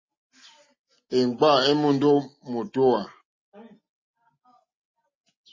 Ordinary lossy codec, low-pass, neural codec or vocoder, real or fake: MP3, 32 kbps; 7.2 kHz; none; real